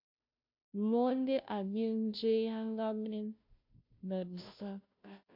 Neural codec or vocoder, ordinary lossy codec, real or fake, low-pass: codec, 16 kHz, 0.5 kbps, FunCodec, trained on Chinese and English, 25 frames a second; MP3, 48 kbps; fake; 5.4 kHz